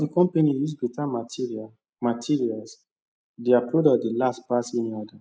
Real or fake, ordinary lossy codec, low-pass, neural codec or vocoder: real; none; none; none